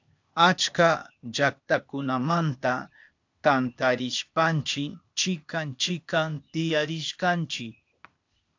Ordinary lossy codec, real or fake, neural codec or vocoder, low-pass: AAC, 48 kbps; fake; codec, 16 kHz, 0.8 kbps, ZipCodec; 7.2 kHz